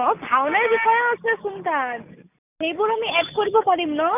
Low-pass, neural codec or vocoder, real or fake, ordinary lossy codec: 3.6 kHz; none; real; none